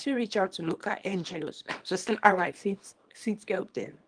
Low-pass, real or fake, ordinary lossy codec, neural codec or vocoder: 9.9 kHz; fake; Opus, 24 kbps; codec, 24 kHz, 0.9 kbps, WavTokenizer, small release